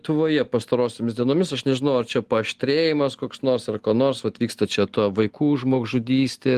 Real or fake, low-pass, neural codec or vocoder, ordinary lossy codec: real; 14.4 kHz; none; Opus, 24 kbps